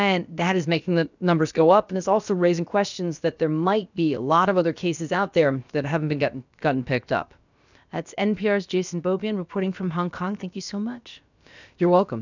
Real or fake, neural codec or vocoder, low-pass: fake; codec, 16 kHz, about 1 kbps, DyCAST, with the encoder's durations; 7.2 kHz